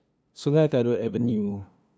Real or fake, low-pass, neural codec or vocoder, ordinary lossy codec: fake; none; codec, 16 kHz, 2 kbps, FunCodec, trained on LibriTTS, 25 frames a second; none